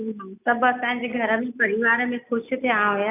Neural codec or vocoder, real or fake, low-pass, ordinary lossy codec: none; real; 3.6 kHz; none